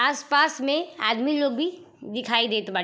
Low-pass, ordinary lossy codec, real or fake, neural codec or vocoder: none; none; real; none